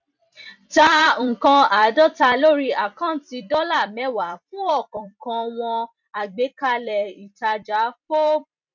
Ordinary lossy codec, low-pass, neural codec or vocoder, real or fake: none; 7.2 kHz; vocoder, 44.1 kHz, 128 mel bands every 256 samples, BigVGAN v2; fake